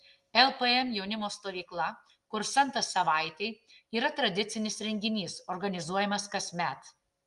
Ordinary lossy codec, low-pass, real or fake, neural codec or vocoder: Opus, 32 kbps; 10.8 kHz; real; none